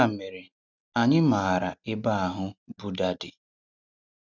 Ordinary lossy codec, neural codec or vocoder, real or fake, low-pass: none; none; real; 7.2 kHz